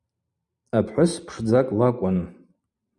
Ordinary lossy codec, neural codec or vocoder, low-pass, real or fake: Opus, 64 kbps; vocoder, 44.1 kHz, 128 mel bands every 512 samples, BigVGAN v2; 10.8 kHz; fake